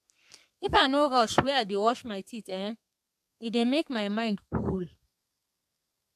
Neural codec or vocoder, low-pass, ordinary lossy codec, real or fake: codec, 32 kHz, 1.9 kbps, SNAC; 14.4 kHz; AAC, 96 kbps; fake